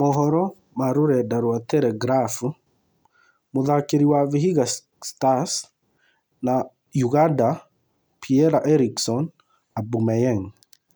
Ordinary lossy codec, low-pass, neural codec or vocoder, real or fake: none; none; none; real